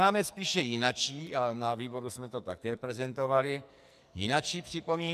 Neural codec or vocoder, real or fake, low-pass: codec, 44.1 kHz, 2.6 kbps, SNAC; fake; 14.4 kHz